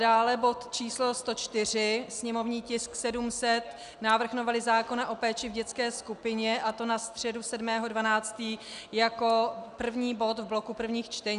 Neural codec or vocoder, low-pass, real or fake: none; 10.8 kHz; real